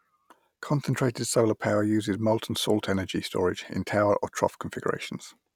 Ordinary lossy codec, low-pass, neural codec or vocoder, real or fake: none; 19.8 kHz; none; real